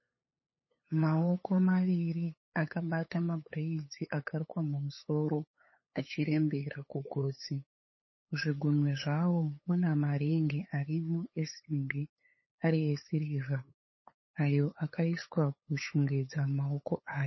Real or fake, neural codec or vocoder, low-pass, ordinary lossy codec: fake; codec, 16 kHz, 8 kbps, FunCodec, trained on LibriTTS, 25 frames a second; 7.2 kHz; MP3, 24 kbps